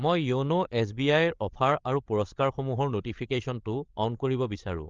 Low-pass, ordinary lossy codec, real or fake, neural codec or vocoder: 7.2 kHz; Opus, 32 kbps; fake; codec, 16 kHz, 8 kbps, FreqCodec, larger model